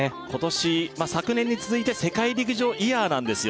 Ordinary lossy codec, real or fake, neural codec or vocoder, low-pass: none; real; none; none